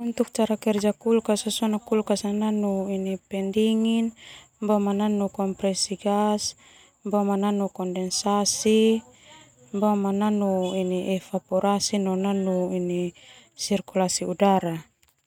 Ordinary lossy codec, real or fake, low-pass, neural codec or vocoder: none; real; 19.8 kHz; none